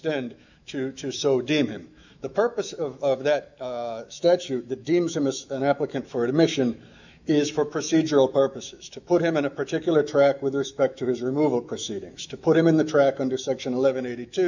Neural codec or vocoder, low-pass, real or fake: autoencoder, 48 kHz, 128 numbers a frame, DAC-VAE, trained on Japanese speech; 7.2 kHz; fake